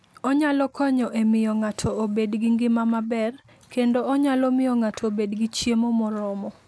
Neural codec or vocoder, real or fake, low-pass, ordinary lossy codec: none; real; none; none